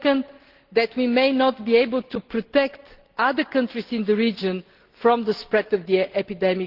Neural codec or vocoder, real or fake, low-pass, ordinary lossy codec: none; real; 5.4 kHz; Opus, 16 kbps